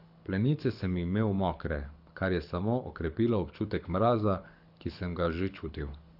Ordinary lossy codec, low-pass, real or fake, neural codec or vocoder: none; 5.4 kHz; fake; codec, 24 kHz, 6 kbps, HILCodec